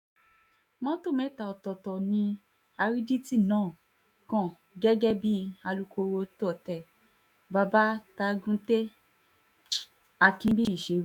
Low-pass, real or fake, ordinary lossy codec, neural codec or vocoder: 19.8 kHz; fake; none; autoencoder, 48 kHz, 128 numbers a frame, DAC-VAE, trained on Japanese speech